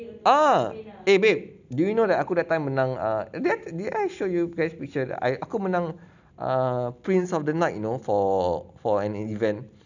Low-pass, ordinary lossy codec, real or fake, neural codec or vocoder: 7.2 kHz; none; real; none